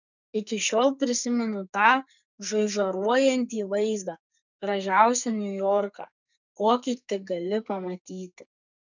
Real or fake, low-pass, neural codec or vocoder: fake; 7.2 kHz; codec, 44.1 kHz, 2.6 kbps, SNAC